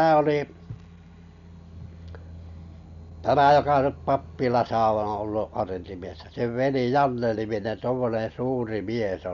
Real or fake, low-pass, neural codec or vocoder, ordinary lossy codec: real; 7.2 kHz; none; none